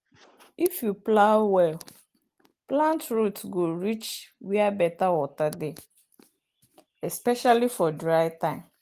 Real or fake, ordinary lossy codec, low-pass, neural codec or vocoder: real; Opus, 24 kbps; 14.4 kHz; none